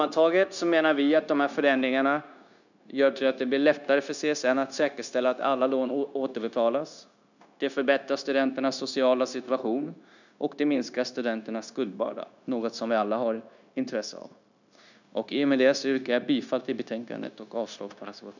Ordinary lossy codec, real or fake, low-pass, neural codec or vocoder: none; fake; 7.2 kHz; codec, 16 kHz, 0.9 kbps, LongCat-Audio-Codec